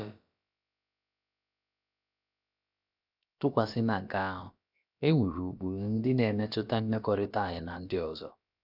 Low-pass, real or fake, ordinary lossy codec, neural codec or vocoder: 5.4 kHz; fake; none; codec, 16 kHz, about 1 kbps, DyCAST, with the encoder's durations